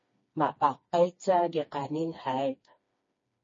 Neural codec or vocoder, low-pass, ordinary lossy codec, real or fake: codec, 16 kHz, 2 kbps, FreqCodec, smaller model; 7.2 kHz; MP3, 32 kbps; fake